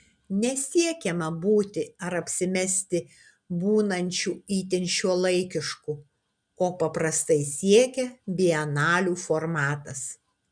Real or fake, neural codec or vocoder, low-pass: real; none; 9.9 kHz